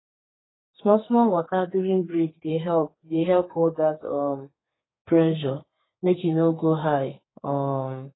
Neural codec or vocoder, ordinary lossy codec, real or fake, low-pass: codec, 32 kHz, 1.9 kbps, SNAC; AAC, 16 kbps; fake; 7.2 kHz